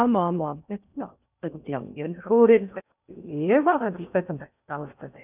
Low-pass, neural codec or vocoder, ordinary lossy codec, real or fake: 3.6 kHz; codec, 16 kHz in and 24 kHz out, 0.6 kbps, FocalCodec, streaming, 4096 codes; none; fake